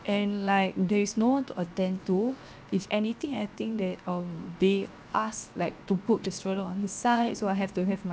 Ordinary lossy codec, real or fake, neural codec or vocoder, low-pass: none; fake; codec, 16 kHz, 0.7 kbps, FocalCodec; none